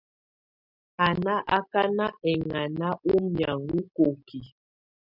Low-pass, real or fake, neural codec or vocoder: 5.4 kHz; real; none